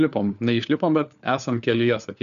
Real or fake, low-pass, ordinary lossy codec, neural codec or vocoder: fake; 7.2 kHz; MP3, 96 kbps; codec, 16 kHz, 4 kbps, FunCodec, trained on LibriTTS, 50 frames a second